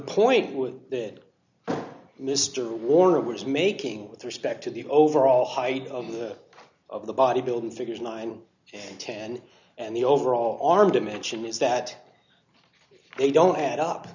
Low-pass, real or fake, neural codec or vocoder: 7.2 kHz; real; none